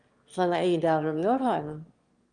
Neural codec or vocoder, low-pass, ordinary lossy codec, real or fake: autoencoder, 22.05 kHz, a latent of 192 numbers a frame, VITS, trained on one speaker; 9.9 kHz; Opus, 24 kbps; fake